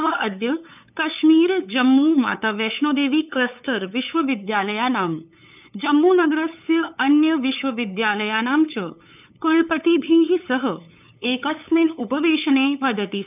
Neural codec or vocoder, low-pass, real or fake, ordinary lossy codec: codec, 16 kHz, 8 kbps, FunCodec, trained on LibriTTS, 25 frames a second; 3.6 kHz; fake; none